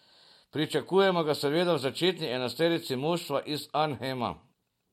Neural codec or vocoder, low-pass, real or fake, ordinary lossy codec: none; 19.8 kHz; real; MP3, 64 kbps